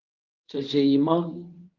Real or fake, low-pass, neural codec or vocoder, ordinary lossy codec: fake; 7.2 kHz; codec, 24 kHz, 0.9 kbps, WavTokenizer, medium speech release version 1; Opus, 24 kbps